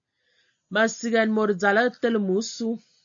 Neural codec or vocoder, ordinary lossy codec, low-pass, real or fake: none; AAC, 64 kbps; 7.2 kHz; real